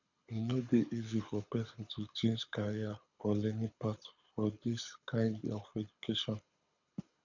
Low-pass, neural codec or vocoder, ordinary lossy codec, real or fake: 7.2 kHz; codec, 24 kHz, 6 kbps, HILCodec; none; fake